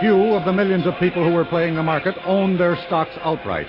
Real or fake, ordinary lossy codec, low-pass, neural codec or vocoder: real; AAC, 24 kbps; 5.4 kHz; none